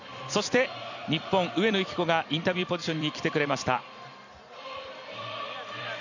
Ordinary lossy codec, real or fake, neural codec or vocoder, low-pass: none; fake; vocoder, 44.1 kHz, 128 mel bands every 256 samples, BigVGAN v2; 7.2 kHz